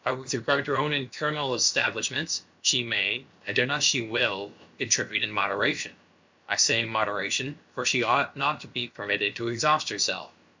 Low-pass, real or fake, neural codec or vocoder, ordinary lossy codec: 7.2 kHz; fake; codec, 16 kHz, about 1 kbps, DyCAST, with the encoder's durations; MP3, 64 kbps